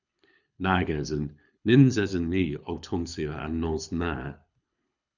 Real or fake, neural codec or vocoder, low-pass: fake; codec, 24 kHz, 6 kbps, HILCodec; 7.2 kHz